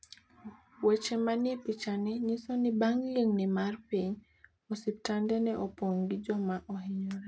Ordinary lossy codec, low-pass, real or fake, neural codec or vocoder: none; none; real; none